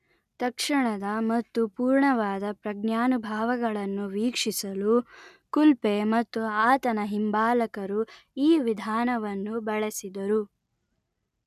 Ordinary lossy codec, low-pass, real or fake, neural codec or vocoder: none; 14.4 kHz; real; none